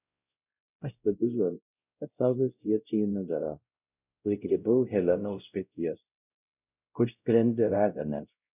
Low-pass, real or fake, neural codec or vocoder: 3.6 kHz; fake; codec, 16 kHz, 0.5 kbps, X-Codec, WavLM features, trained on Multilingual LibriSpeech